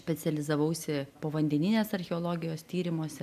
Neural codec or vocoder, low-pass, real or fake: none; 14.4 kHz; real